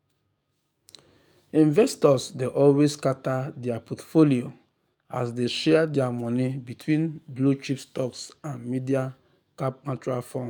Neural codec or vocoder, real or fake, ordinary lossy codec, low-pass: codec, 44.1 kHz, 7.8 kbps, DAC; fake; none; 19.8 kHz